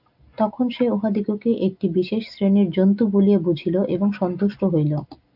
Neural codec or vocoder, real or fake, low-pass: none; real; 5.4 kHz